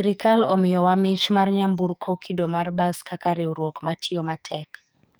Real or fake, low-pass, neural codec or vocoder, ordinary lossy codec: fake; none; codec, 44.1 kHz, 2.6 kbps, SNAC; none